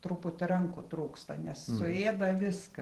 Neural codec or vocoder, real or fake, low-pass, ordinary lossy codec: vocoder, 44.1 kHz, 128 mel bands every 512 samples, BigVGAN v2; fake; 14.4 kHz; Opus, 16 kbps